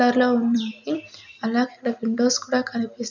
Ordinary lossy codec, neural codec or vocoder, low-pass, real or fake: none; none; 7.2 kHz; real